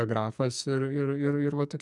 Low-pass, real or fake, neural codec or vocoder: 10.8 kHz; fake; codec, 44.1 kHz, 2.6 kbps, SNAC